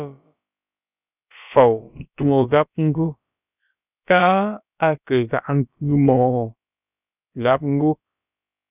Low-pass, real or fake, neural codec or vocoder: 3.6 kHz; fake; codec, 16 kHz, about 1 kbps, DyCAST, with the encoder's durations